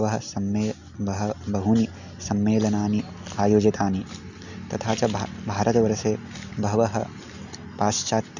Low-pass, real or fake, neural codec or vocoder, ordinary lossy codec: 7.2 kHz; real; none; none